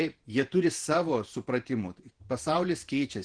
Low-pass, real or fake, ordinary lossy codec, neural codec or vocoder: 9.9 kHz; fake; Opus, 16 kbps; vocoder, 48 kHz, 128 mel bands, Vocos